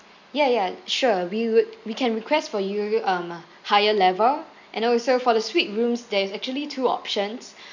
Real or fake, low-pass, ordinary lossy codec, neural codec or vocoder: real; 7.2 kHz; none; none